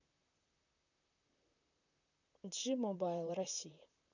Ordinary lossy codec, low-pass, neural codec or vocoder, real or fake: none; 7.2 kHz; vocoder, 44.1 kHz, 128 mel bands, Pupu-Vocoder; fake